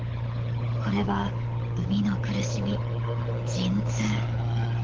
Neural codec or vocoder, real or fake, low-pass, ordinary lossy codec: codec, 16 kHz, 16 kbps, FunCodec, trained on LibriTTS, 50 frames a second; fake; 7.2 kHz; Opus, 16 kbps